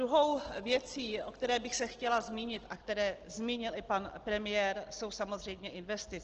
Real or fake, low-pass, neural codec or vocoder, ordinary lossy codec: real; 7.2 kHz; none; Opus, 16 kbps